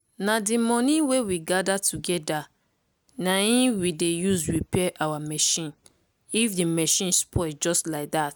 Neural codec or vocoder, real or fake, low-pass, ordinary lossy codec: none; real; none; none